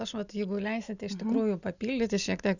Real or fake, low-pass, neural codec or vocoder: real; 7.2 kHz; none